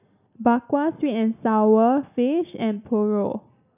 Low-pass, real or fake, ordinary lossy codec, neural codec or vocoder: 3.6 kHz; real; none; none